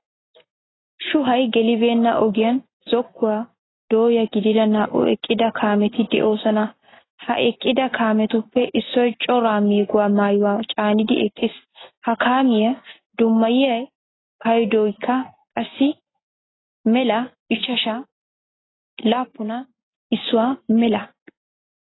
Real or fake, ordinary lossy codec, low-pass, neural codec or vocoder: real; AAC, 16 kbps; 7.2 kHz; none